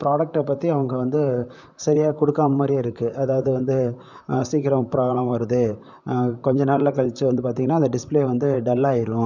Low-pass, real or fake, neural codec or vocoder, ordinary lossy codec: 7.2 kHz; fake; vocoder, 44.1 kHz, 128 mel bands, Pupu-Vocoder; none